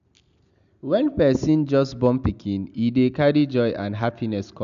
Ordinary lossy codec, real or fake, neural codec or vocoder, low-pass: none; real; none; 7.2 kHz